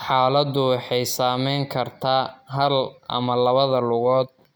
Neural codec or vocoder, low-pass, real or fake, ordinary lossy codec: none; none; real; none